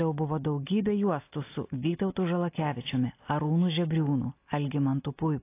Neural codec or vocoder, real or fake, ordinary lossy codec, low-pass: none; real; AAC, 24 kbps; 3.6 kHz